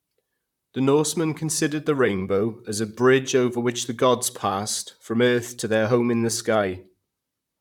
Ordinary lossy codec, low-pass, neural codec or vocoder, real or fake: none; 19.8 kHz; vocoder, 44.1 kHz, 128 mel bands, Pupu-Vocoder; fake